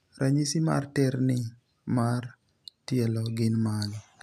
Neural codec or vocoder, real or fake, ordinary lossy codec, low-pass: none; real; none; 14.4 kHz